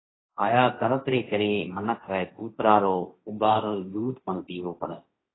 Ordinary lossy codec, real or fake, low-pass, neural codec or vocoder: AAC, 16 kbps; fake; 7.2 kHz; codec, 16 kHz, 1.1 kbps, Voila-Tokenizer